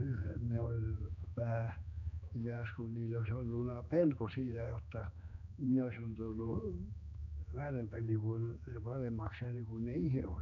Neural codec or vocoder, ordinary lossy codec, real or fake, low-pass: codec, 16 kHz, 2 kbps, X-Codec, HuBERT features, trained on general audio; none; fake; 7.2 kHz